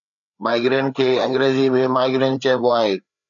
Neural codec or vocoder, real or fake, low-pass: codec, 16 kHz, 4 kbps, FreqCodec, larger model; fake; 7.2 kHz